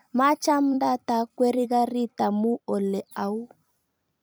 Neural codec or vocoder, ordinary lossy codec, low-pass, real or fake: vocoder, 44.1 kHz, 128 mel bands every 256 samples, BigVGAN v2; none; none; fake